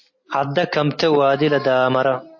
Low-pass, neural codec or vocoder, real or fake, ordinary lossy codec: 7.2 kHz; none; real; MP3, 32 kbps